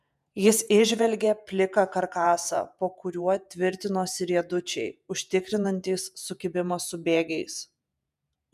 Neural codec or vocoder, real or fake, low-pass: vocoder, 48 kHz, 128 mel bands, Vocos; fake; 14.4 kHz